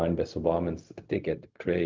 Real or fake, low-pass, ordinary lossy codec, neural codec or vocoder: fake; 7.2 kHz; Opus, 32 kbps; codec, 16 kHz, 0.4 kbps, LongCat-Audio-Codec